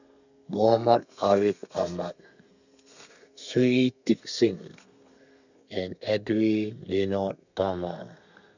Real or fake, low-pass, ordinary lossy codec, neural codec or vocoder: fake; 7.2 kHz; none; codec, 32 kHz, 1.9 kbps, SNAC